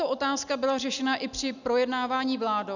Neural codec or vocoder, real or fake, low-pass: none; real; 7.2 kHz